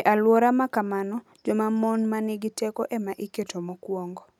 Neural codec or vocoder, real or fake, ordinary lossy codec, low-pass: none; real; none; 19.8 kHz